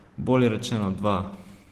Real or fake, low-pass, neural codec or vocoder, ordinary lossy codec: real; 14.4 kHz; none; Opus, 16 kbps